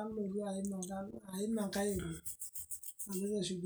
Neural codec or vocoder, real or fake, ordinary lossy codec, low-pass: none; real; none; none